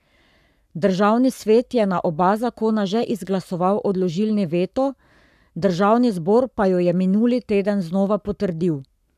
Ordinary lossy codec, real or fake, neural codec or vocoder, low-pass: none; fake; codec, 44.1 kHz, 7.8 kbps, Pupu-Codec; 14.4 kHz